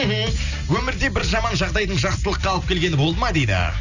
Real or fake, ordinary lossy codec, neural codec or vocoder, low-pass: real; none; none; 7.2 kHz